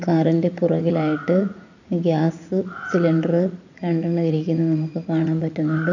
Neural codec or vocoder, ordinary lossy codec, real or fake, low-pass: vocoder, 44.1 kHz, 80 mel bands, Vocos; none; fake; 7.2 kHz